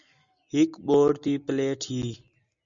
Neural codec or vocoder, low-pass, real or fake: none; 7.2 kHz; real